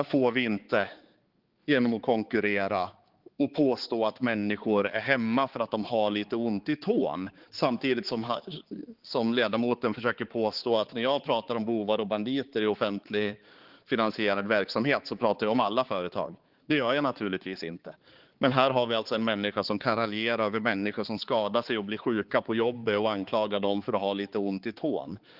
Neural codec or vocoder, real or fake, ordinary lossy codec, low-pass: codec, 16 kHz, 4 kbps, X-Codec, HuBERT features, trained on balanced general audio; fake; Opus, 16 kbps; 5.4 kHz